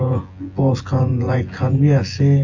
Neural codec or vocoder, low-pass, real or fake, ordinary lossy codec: vocoder, 24 kHz, 100 mel bands, Vocos; 7.2 kHz; fake; Opus, 32 kbps